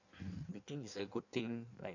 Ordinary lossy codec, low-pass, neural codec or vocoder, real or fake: none; 7.2 kHz; codec, 16 kHz in and 24 kHz out, 1.1 kbps, FireRedTTS-2 codec; fake